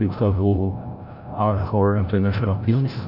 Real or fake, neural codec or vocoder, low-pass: fake; codec, 16 kHz, 0.5 kbps, FreqCodec, larger model; 5.4 kHz